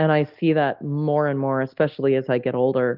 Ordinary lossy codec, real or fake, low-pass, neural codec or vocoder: Opus, 32 kbps; real; 5.4 kHz; none